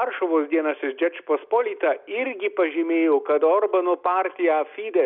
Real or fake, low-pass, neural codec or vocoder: real; 5.4 kHz; none